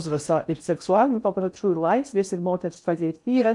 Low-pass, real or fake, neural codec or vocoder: 10.8 kHz; fake; codec, 16 kHz in and 24 kHz out, 0.6 kbps, FocalCodec, streaming, 4096 codes